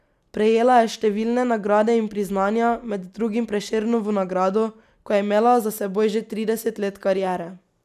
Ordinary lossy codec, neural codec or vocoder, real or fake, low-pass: none; none; real; 14.4 kHz